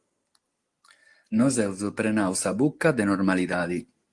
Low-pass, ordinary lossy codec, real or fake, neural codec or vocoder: 10.8 kHz; Opus, 24 kbps; real; none